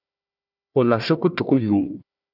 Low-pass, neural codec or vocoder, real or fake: 5.4 kHz; codec, 16 kHz, 1 kbps, FunCodec, trained on Chinese and English, 50 frames a second; fake